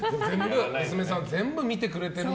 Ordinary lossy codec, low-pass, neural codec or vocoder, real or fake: none; none; none; real